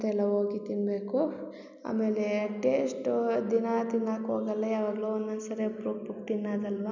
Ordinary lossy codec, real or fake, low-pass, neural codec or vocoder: none; real; 7.2 kHz; none